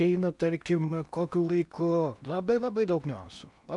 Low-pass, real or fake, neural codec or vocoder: 10.8 kHz; fake; codec, 16 kHz in and 24 kHz out, 0.8 kbps, FocalCodec, streaming, 65536 codes